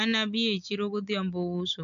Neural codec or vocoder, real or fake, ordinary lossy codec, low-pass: none; real; MP3, 96 kbps; 7.2 kHz